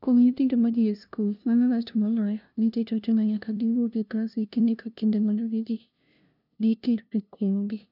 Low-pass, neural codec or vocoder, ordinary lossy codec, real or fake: 5.4 kHz; codec, 16 kHz, 0.5 kbps, FunCodec, trained on LibriTTS, 25 frames a second; none; fake